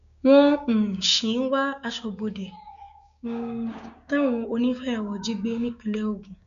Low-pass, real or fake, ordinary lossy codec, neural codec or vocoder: 7.2 kHz; fake; none; codec, 16 kHz, 6 kbps, DAC